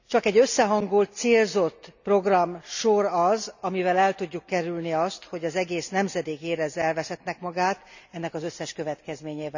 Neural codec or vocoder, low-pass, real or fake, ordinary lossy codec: none; 7.2 kHz; real; none